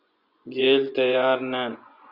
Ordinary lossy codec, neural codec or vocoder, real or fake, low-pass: Opus, 64 kbps; vocoder, 24 kHz, 100 mel bands, Vocos; fake; 5.4 kHz